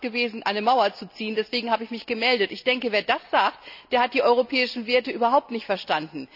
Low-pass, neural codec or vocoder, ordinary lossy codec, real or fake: 5.4 kHz; none; none; real